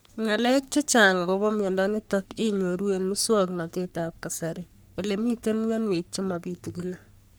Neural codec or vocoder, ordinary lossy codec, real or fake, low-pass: codec, 44.1 kHz, 3.4 kbps, Pupu-Codec; none; fake; none